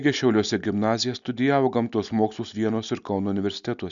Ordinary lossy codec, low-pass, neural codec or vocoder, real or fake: MP3, 96 kbps; 7.2 kHz; none; real